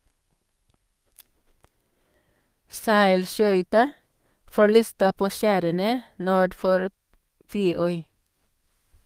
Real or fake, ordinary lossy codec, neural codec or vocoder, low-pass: fake; Opus, 32 kbps; codec, 32 kHz, 1.9 kbps, SNAC; 14.4 kHz